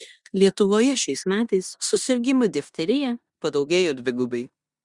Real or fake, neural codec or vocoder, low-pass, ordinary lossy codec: fake; codec, 16 kHz in and 24 kHz out, 0.9 kbps, LongCat-Audio-Codec, four codebook decoder; 10.8 kHz; Opus, 64 kbps